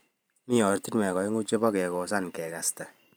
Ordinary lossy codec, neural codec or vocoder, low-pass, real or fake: none; vocoder, 44.1 kHz, 128 mel bands every 512 samples, BigVGAN v2; none; fake